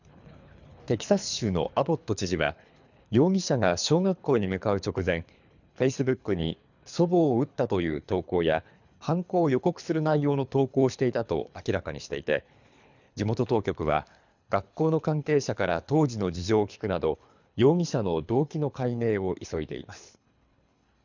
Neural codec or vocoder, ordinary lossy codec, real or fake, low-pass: codec, 24 kHz, 3 kbps, HILCodec; none; fake; 7.2 kHz